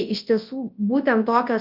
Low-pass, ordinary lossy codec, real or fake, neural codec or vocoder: 5.4 kHz; Opus, 32 kbps; fake; codec, 24 kHz, 0.9 kbps, WavTokenizer, large speech release